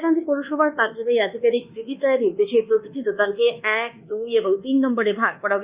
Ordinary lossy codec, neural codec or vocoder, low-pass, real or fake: Opus, 64 kbps; codec, 24 kHz, 1.2 kbps, DualCodec; 3.6 kHz; fake